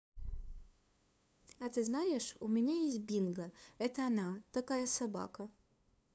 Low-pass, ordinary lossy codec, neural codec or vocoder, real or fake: none; none; codec, 16 kHz, 2 kbps, FunCodec, trained on LibriTTS, 25 frames a second; fake